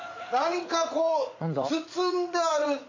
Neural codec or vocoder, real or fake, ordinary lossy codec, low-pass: vocoder, 44.1 kHz, 80 mel bands, Vocos; fake; AAC, 48 kbps; 7.2 kHz